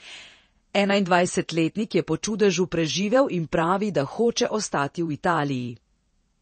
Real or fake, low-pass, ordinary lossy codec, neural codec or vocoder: fake; 10.8 kHz; MP3, 32 kbps; vocoder, 48 kHz, 128 mel bands, Vocos